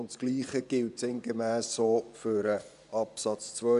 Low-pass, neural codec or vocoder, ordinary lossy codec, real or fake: 10.8 kHz; vocoder, 24 kHz, 100 mel bands, Vocos; none; fake